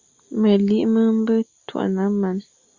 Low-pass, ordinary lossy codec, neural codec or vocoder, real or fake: 7.2 kHz; Opus, 64 kbps; none; real